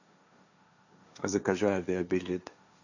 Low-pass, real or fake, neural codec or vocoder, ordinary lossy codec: 7.2 kHz; fake; codec, 16 kHz, 1.1 kbps, Voila-Tokenizer; none